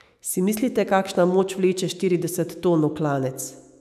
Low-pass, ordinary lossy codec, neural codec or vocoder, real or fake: 14.4 kHz; none; autoencoder, 48 kHz, 128 numbers a frame, DAC-VAE, trained on Japanese speech; fake